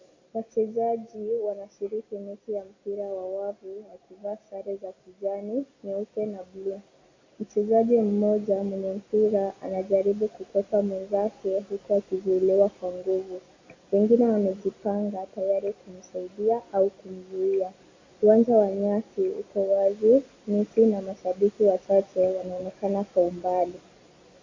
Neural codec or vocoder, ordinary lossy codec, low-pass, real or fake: none; AAC, 32 kbps; 7.2 kHz; real